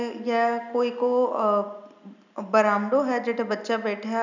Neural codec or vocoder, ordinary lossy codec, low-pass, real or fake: none; none; 7.2 kHz; real